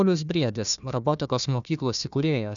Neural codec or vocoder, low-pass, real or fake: codec, 16 kHz, 1 kbps, FunCodec, trained on Chinese and English, 50 frames a second; 7.2 kHz; fake